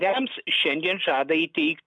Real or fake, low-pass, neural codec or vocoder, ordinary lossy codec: real; 9.9 kHz; none; MP3, 96 kbps